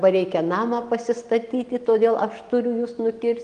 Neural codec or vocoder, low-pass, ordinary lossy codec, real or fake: none; 9.9 kHz; Opus, 24 kbps; real